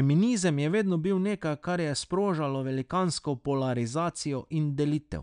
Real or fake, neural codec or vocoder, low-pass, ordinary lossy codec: real; none; 9.9 kHz; none